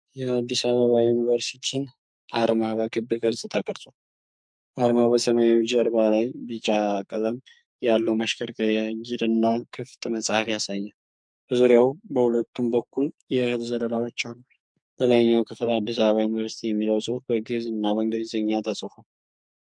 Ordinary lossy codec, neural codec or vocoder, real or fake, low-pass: MP3, 64 kbps; codec, 44.1 kHz, 2.6 kbps, SNAC; fake; 9.9 kHz